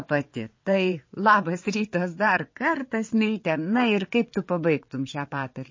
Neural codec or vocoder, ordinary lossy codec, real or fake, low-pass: vocoder, 22.05 kHz, 80 mel bands, WaveNeXt; MP3, 32 kbps; fake; 7.2 kHz